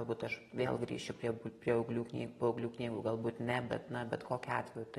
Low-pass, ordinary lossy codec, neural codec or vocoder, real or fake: 19.8 kHz; AAC, 32 kbps; none; real